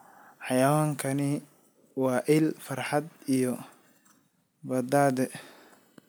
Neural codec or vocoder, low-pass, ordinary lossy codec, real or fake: none; none; none; real